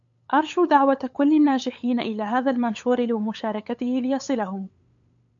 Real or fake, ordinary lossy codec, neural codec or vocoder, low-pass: fake; AAC, 64 kbps; codec, 16 kHz, 8 kbps, FunCodec, trained on LibriTTS, 25 frames a second; 7.2 kHz